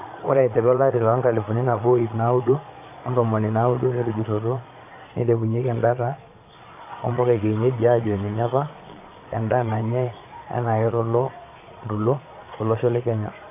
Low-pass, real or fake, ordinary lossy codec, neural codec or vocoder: 3.6 kHz; fake; AAC, 24 kbps; vocoder, 22.05 kHz, 80 mel bands, Vocos